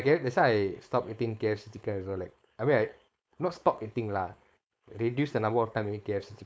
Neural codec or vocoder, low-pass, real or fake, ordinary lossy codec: codec, 16 kHz, 4.8 kbps, FACodec; none; fake; none